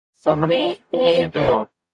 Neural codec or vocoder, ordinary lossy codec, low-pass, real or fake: codec, 44.1 kHz, 0.9 kbps, DAC; AAC, 64 kbps; 10.8 kHz; fake